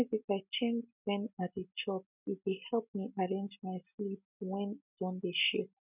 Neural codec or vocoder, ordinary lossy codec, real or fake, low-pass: none; none; real; 3.6 kHz